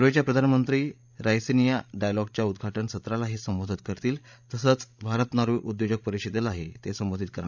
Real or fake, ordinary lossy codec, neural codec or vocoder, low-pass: fake; none; codec, 16 kHz, 16 kbps, FreqCodec, larger model; 7.2 kHz